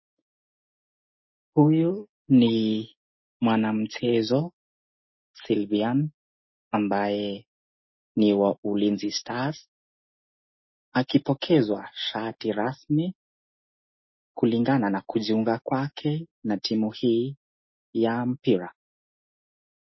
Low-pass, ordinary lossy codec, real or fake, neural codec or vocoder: 7.2 kHz; MP3, 24 kbps; real; none